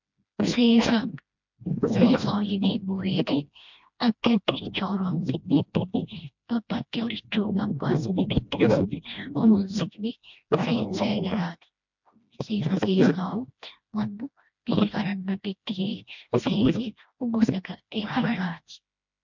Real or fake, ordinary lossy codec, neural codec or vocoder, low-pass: fake; MP3, 64 kbps; codec, 16 kHz, 1 kbps, FreqCodec, smaller model; 7.2 kHz